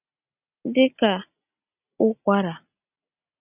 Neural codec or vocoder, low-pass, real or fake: none; 3.6 kHz; real